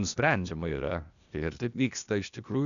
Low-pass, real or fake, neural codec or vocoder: 7.2 kHz; fake; codec, 16 kHz, 0.8 kbps, ZipCodec